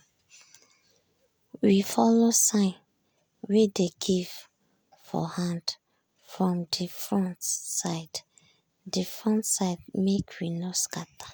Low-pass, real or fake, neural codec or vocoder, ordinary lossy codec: none; real; none; none